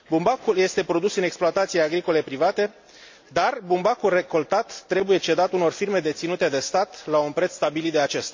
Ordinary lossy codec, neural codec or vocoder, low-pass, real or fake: MP3, 48 kbps; none; 7.2 kHz; real